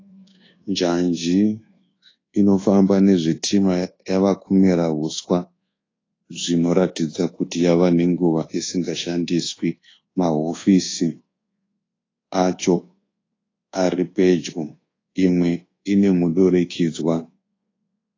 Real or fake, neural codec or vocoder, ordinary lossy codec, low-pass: fake; codec, 24 kHz, 1.2 kbps, DualCodec; AAC, 32 kbps; 7.2 kHz